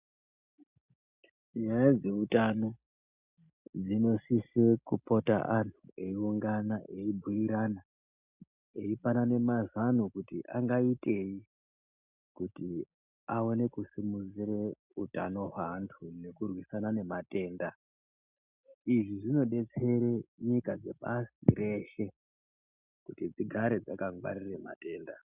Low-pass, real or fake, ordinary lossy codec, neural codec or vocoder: 3.6 kHz; real; Opus, 64 kbps; none